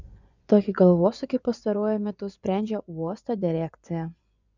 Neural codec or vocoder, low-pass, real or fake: vocoder, 24 kHz, 100 mel bands, Vocos; 7.2 kHz; fake